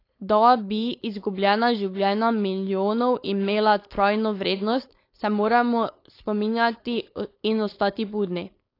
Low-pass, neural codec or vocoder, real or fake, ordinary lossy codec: 5.4 kHz; codec, 16 kHz, 4.8 kbps, FACodec; fake; AAC, 32 kbps